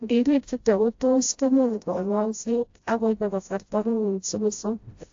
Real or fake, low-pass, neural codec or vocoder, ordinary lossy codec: fake; 7.2 kHz; codec, 16 kHz, 0.5 kbps, FreqCodec, smaller model; AAC, 48 kbps